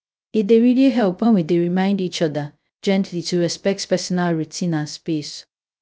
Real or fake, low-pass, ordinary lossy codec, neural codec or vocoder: fake; none; none; codec, 16 kHz, 0.3 kbps, FocalCodec